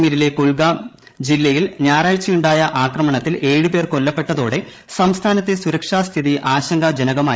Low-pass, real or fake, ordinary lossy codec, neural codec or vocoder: none; fake; none; codec, 16 kHz, 8 kbps, FreqCodec, larger model